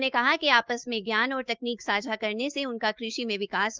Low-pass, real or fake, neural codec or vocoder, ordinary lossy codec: 7.2 kHz; fake; autoencoder, 48 kHz, 128 numbers a frame, DAC-VAE, trained on Japanese speech; Opus, 32 kbps